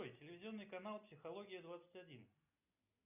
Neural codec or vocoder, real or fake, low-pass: none; real; 3.6 kHz